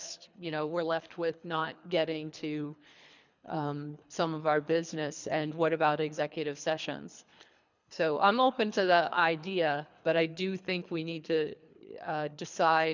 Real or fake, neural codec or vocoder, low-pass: fake; codec, 24 kHz, 3 kbps, HILCodec; 7.2 kHz